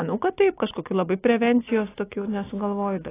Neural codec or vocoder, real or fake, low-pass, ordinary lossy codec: none; real; 3.6 kHz; AAC, 16 kbps